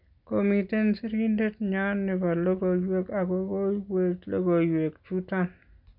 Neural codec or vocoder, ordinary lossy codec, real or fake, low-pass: none; none; real; 5.4 kHz